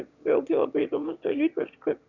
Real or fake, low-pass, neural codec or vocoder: fake; 7.2 kHz; autoencoder, 22.05 kHz, a latent of 192 numbers a frame, VITS, trained on one speaker